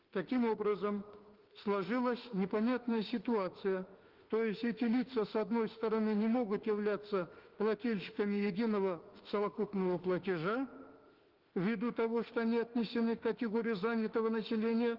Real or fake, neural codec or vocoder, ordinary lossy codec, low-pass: fake; autoencoder, 48 kHz, 32 numbers a frame, DAC-VAE, trained on Japanese speech; Opus, 16 kbps; 5.4 kHz